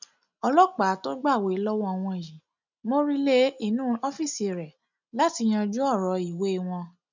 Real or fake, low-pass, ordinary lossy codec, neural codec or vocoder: real; 7.2 kHz; none; none